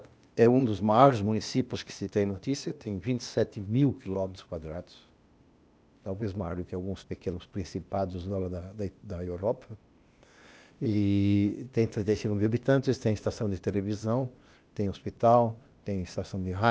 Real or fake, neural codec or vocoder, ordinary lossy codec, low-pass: fake; codec, 16 kHz, 0.8 kbps, ZipCodec; none; none